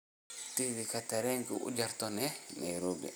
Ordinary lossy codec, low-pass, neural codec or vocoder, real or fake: none; none; none; real